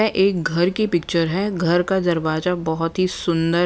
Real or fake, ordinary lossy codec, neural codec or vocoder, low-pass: real; none; none; none